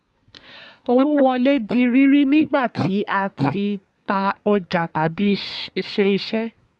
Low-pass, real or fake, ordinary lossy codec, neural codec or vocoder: none; fake; none; codec, 24 kHz, 1 kbps, SNAC